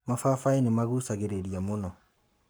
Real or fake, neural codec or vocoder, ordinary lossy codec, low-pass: fake; codec, 44.1 kHz, 7.8 kbps, Pupu-Codec; none; none